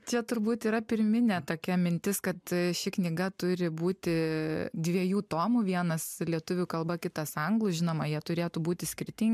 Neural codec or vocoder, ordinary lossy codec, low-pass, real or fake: none; MP3, 96 kbps; 14.4 kHz; real